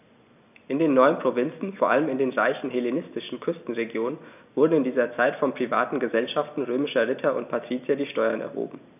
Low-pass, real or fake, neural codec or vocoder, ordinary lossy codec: 3.6 kHz; real; none; none